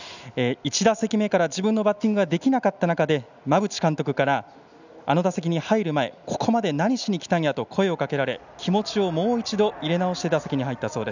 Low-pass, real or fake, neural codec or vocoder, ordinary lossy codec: 7.2 kHz; real; none; none